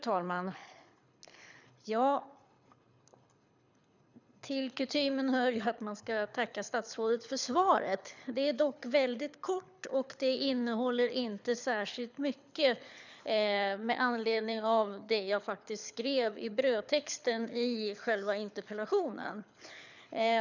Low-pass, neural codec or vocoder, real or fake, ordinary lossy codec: 7.2 kHz; codec, 24 kHz, 6 kbps, HILCodec; fake; none